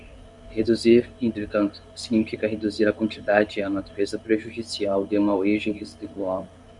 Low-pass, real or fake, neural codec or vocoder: 10.8 kHz; fake; codec, 24 kHz, 0.9 kbps, WavTokenizer, medium speech release version 1